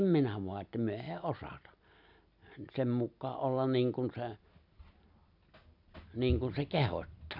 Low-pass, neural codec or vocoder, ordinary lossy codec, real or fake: 5.4 kHz; none; none; real